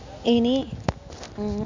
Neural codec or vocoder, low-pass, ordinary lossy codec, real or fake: none; 7.2 kHz; MP3, 64 kbps; real